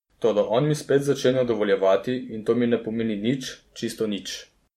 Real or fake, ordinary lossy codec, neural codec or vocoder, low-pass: fake; MP3, 64 kbps; vocoder, 44.1 kHz, 128 mel bands every 512 samples, BigVGAN v2; 19.8 kHz